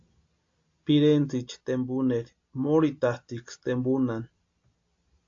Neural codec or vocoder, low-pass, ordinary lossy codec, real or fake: none; 7.2 kHz; AAC, 48 kbps; real